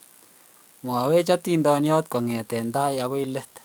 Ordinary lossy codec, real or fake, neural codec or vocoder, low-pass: none; fake; codec, 44.1 kHz, 7.8 kbps, DAC; none